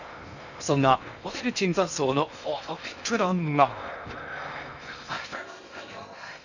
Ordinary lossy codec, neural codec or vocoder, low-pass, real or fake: none; codec, 16 kHz in and 24 kHz out, 0.6 kbps, FocalCodec, streaming, 2048 codes; 7.2 kHz; fake